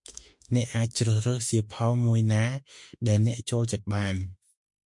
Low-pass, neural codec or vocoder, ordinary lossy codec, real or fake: 10.8 kHz; autoencoder, 48 kHz, 32 numbers a frame, DAC-VAE, trained on Japanese speech; MP3, 64 kbps; fake